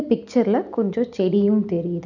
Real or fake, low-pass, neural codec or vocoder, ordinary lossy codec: real; 7.2 kHz; none; none